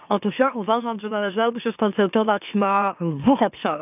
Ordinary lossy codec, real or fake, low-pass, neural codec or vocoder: none; fake; 3.6 kHz; autoencoder, 44.1 kHz, a latent of 192 numbers a frame, MeloTTS